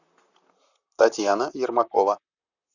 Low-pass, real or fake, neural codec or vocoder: 7.2 kHz; fake; vocoder, 44.1 kHz, 128 mel bands, Pupu-Vocoder